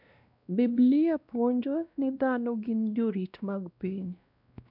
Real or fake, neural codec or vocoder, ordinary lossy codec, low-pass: fake; codec, 16 kHz, 1 kbps, X-Codec, WavLM features, trained on Multilingual LibriSpeech; none; 5.4 kHz